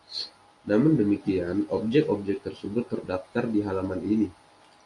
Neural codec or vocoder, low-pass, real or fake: none; 10.8 kHz; real